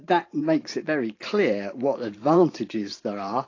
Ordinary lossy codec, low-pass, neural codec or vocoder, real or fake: AAC, 32 kbps; 7.2 kHz; vocoder, 44.1 kHz, 128 mel bands every 512 samples, BigVGAN v2; fake